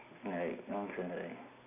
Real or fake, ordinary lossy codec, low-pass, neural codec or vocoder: real; none; 3.6 kHz; none